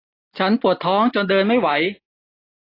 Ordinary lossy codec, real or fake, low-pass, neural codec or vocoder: AAC, 24 kbps; real; 5.4 kHz; none